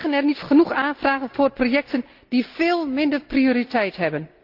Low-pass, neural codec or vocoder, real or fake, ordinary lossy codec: 5.4 kHz; none; real; Opus, 24 kbps